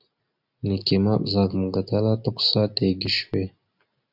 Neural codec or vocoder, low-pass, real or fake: none; 5.4 kHz; real